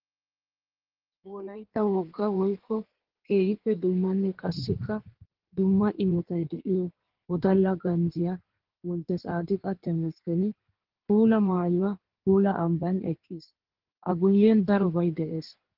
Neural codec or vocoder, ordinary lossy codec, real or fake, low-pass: codec, 16 kHz in and 24 kHz out, 1.1 kbps, FireRedTTS-2 codec; Opus, 16 kbps; fake; 5.4 kHz